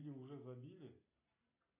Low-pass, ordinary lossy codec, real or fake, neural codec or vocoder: 3.6 kHz; AAC, 32 kbps; real; none